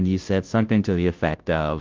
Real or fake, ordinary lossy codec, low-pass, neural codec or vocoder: fake; Opus, 24 kbps; 7.2 kHz; codec, 16 kHz, 0.5 kbps, FunCodec, trained on Chinese and English, 25 frames a second